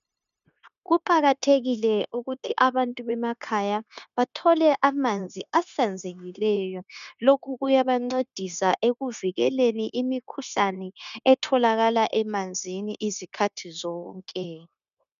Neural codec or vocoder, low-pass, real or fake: codec, 16 kHz, 0.9 kbps, LongCat-Audio-Codec; 7.2 kHz; fake